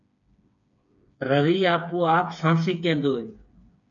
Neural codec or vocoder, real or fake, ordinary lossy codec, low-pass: codec, 16 kHz, 4 kbps, FreqCodec, smaller model; fake; MP3, 64 kbps; 7.2 kHz